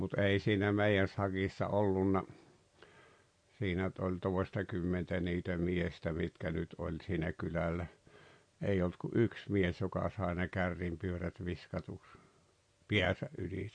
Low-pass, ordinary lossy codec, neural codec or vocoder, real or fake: 9.9 kHz; MP3, 48 kbps; none; real